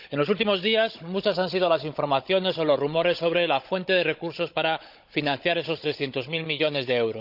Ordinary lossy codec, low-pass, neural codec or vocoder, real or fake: none; 5.4 kHz; codec, 16 kHz, 16 kbps, FunCodec, trained on Chinese and English, 50 frames a second; fake